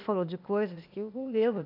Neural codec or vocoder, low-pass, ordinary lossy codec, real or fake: codec, 16 kHz, 0.8 kbps, ZipCodec; 5.4 kHz; none; fake